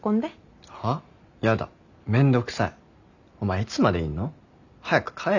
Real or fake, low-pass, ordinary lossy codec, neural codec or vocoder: real; 7.2 kHz; none; none